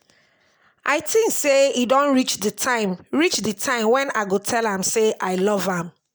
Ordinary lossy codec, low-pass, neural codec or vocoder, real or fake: none; none; none; real